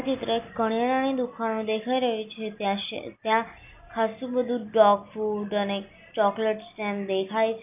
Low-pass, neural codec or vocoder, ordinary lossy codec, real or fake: 3.6 kHz; none; none; real